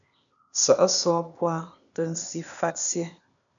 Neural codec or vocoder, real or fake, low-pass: codec, 16 kHz, 0.8 kbps, ZipCodec; fake; 7.2 kHz